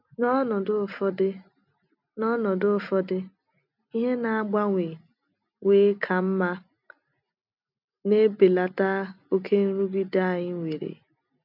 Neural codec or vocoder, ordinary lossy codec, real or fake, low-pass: none; none; real; 5.4 kHz